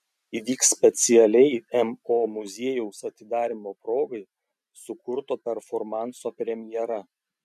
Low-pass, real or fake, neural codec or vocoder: 14.4 kHz; real; none